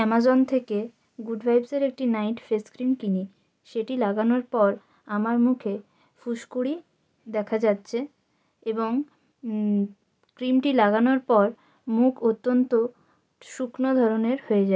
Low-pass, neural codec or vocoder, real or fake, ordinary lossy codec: none; none; real; none